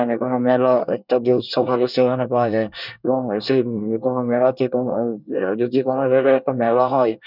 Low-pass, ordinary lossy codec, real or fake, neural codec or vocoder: 5.4 kHz; none; fake; codec, 24 kHz, 1 kbps, SNAC